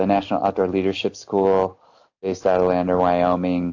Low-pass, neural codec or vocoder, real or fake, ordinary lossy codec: 7.2 kHz; none; real; AAC, 48 kbps